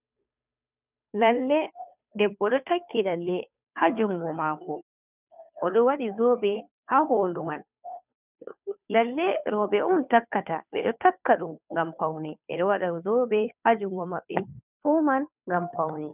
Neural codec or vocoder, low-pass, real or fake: codec, 16 kHz, 2 kbps, FunCodec, trained on Chinese and English, 25 frames a second; 3.6 kHz; fake